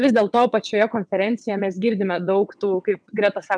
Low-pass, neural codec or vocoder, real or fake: 9.9 kHz; vocoder, 22.05 kHz, 80 mel bands, WaveNeXt; fake